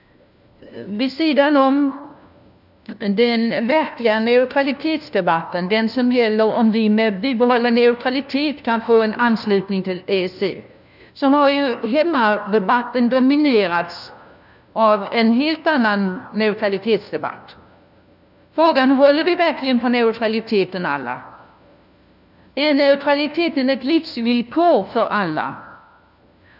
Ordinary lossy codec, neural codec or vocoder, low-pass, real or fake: none; codec, 16 kHz, 1 kbps, FunCodec, trained on LibriTTS, 50 frames a second; 5.4 kHz; fake